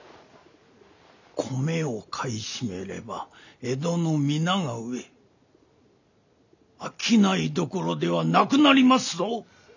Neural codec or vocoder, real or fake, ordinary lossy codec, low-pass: none; real; none; 7.2 kHz